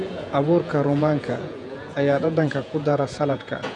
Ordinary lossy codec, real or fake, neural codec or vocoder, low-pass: none; fake; vocoder, 48 kHz, 128 mel bands, Vocos; 10.8 kHz